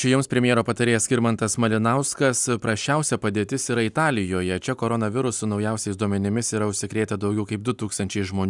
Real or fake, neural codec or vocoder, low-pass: real; none; 10.8 kHz